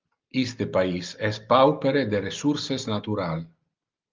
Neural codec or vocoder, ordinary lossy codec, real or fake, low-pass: none; Opus, 32 kbps; real; 7.2 kHz